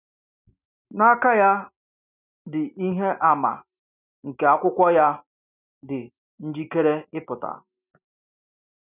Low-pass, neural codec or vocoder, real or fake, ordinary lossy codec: 3.6 kHz; none; real; none